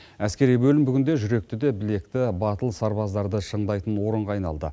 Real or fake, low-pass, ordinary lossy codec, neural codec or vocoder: real; none; none; none